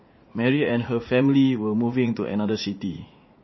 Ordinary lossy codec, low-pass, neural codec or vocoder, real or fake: MP3, 24 kbps; 7.2 kHz; vocoder, 44.1 kHz, 80 mel bands, Vocos; fake